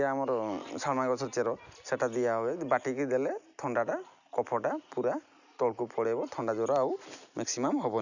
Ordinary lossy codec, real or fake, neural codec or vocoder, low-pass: none; real; none; 7.2 kHz